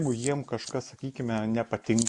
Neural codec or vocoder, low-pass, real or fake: none; 10.8 kHz; real